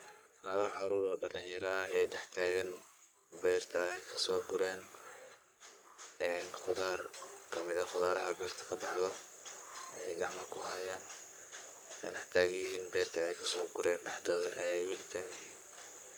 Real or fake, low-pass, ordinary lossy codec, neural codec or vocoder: fake; none; none; codec, 44.1 kHz, 3.4 kbps, Pupu-Codec